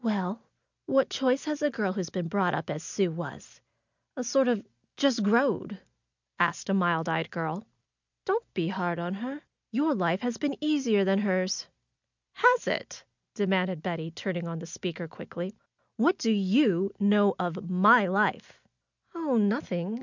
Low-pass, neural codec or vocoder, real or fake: 7.2 kHz; none; real